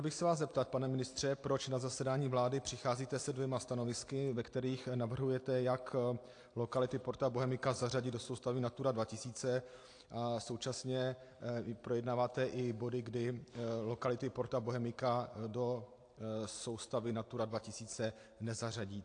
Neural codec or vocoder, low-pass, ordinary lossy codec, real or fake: none; 9.9 kHz; AAC, 48 kbps; real